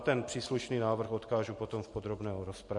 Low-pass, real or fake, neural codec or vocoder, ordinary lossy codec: 10.8 kHz; real; none; MP3, 48 kbps